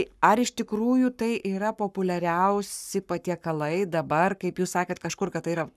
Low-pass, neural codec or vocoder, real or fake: 14.4 kHz; codec, 44.1 kHz, 7.8 kbps, Pupu-Codec; fake